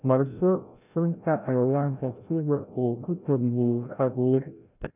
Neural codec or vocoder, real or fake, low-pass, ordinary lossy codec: codec, 16 kHz, 0.5 kbps, FreqCodec, larger model; fake; 3.6 kHz; none